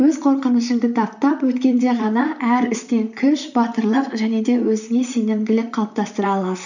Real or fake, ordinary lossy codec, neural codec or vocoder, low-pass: fake; none; vocoder, 44.1 kHz, 128 mel bands, Pupu-Vocoder; 7.2 kHz